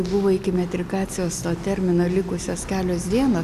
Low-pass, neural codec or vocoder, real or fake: 14.4 kHz; none; real